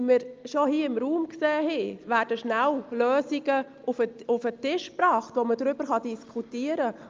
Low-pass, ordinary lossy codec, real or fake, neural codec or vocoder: 7.2 kHz; Opus, 24 kbps; real; none